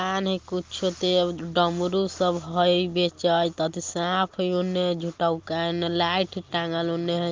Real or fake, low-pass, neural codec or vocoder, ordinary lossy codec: real; 7.2 kHz; none; Opus, 24 kbps